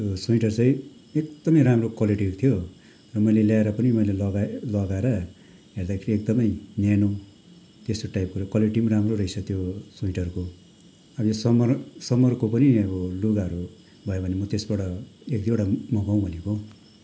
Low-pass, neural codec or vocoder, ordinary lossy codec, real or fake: none; none; none; real